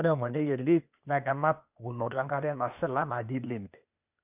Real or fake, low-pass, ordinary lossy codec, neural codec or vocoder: fake; 3.6 kHz; none; codec, 16 kHz, 0.8 kbps, ZipCodec